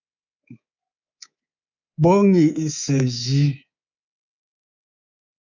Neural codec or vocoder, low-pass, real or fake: codec, 24 kHz, 3.1 kbps, DualCodec; 7.2 kHz; fake